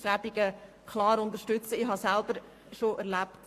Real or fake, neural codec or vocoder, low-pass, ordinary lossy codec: fake; codec, 44.1 kHz, 7.8 kbps, Pupu-Codec; 14.4 kHz; AAC, 64 kbps